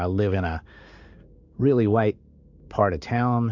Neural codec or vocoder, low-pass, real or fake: codec, 16 kHz in and 24 kHz out, 1 kbps, XY-Tokenizer; 7.2 kHz; fake